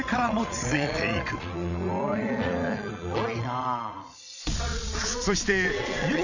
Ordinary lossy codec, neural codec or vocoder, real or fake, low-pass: none; vocoder, 22.05 kHz, 80 mel bands, Vocos; fake; 7.2 kHz